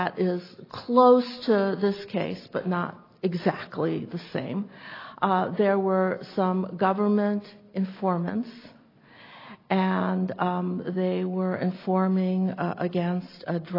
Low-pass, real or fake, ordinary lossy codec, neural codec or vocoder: 5.4 kHz; real; AAC, 24 kbps; none